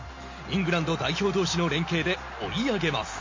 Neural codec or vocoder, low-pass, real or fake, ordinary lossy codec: none; 7.2 kHz; real; MP3, 32 kbps